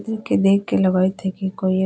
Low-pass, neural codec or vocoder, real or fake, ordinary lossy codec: none; none; real; none